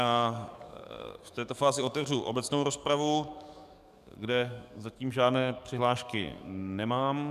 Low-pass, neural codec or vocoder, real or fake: 14.4 kHz; codec, 44.1 kHz, 7.8 kbps, DAC; fake